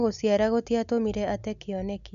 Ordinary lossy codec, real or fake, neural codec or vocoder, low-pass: none; real; none; 7.2 kHz